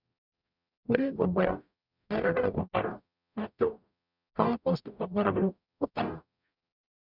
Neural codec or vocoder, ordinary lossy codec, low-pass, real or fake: codec, 44.1 kHz, 0.9 kbps, DAC; none; 5.4 kHz; fake